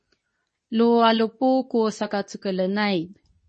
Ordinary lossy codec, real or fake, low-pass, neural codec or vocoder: MP3, 32 kbps; fake; 10.8 kHz; codec, 24 kHz, 0.9 kbps, WavTokenizer, medium speech release version 2